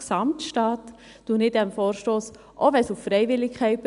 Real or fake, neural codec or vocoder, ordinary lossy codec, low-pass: real; none; none; 10.8 kHz